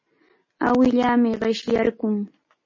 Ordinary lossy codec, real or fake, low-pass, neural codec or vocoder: MP3, 32 kbps; real; 7.2 kHz; none